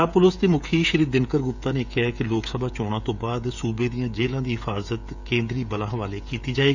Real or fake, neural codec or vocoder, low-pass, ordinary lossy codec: fake; codec, 16 kHz, 16 kbps, FreqCodec, smaller model; 7.2 kHz; none